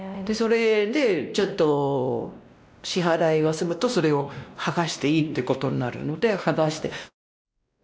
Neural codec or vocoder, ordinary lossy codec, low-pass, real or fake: codec, 16 kHz, 1 kbps, X-Codec, WavLM features, trained on Multilingual LibriSpeech; none; none; fake